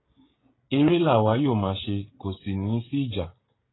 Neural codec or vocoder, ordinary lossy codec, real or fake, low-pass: codec, 16 kHz, 16 kbps, FreqCodec, smaller model; AAC, 16 kbps; fake; 7.2 kHz